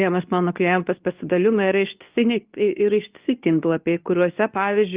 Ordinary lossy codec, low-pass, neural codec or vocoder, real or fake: Opus, 24 kbps; 3.6 kHz; codec, 24 kHz, 0.9 kbps, WavTokenizer, medium speech release version 2; fake